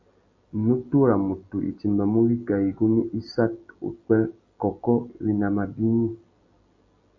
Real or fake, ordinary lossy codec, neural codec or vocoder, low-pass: real; MP3, 64 kbps; none; 7.2 kHz